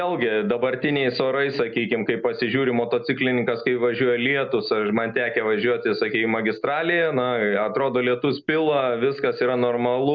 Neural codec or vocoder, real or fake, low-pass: none; real; 7.2 kHz